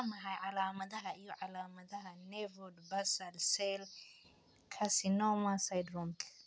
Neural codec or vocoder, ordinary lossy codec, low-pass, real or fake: none; none; none; real